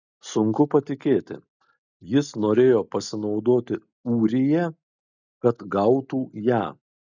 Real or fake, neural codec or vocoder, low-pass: real; none; 7.2 kHz